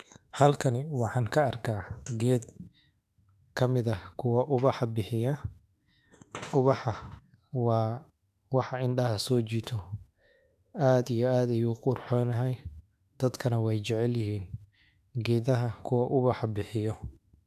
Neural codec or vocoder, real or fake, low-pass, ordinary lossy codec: autoencoder, 48 kHz, 32 numbers a frame, DAC-VAE, trained on Japanese speech; fake; 14.4 kHz; none